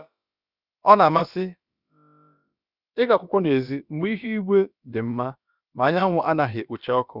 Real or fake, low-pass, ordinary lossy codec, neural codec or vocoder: fake; 5.4 kHz; Opus, 64 kbps; codec, 16 kHz, about 1 kbps, DyCAST, with the encoder's durations